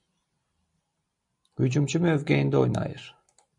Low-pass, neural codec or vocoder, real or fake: 10.8 kHz; vocoder, 44.1 kHz, 128 mel bands every 256 samples, BigVGAN v2; fake